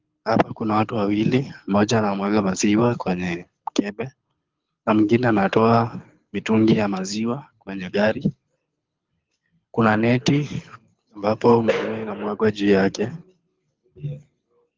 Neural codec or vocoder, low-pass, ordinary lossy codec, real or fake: codec, 24 kHz, 6 kbps, HILCodec; 7.2 kHz; Opus, 32 kbps; fake